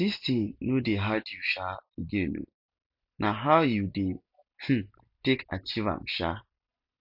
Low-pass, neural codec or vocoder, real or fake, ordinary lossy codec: 5.4 kHz; none; real; MP3, 48 kbps